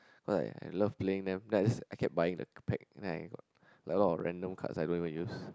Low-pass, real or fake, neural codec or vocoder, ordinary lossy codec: none; real; none; none